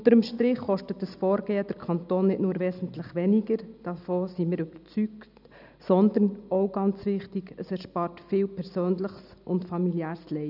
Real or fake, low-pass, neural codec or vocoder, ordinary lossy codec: real; 5.4 kHz; none; none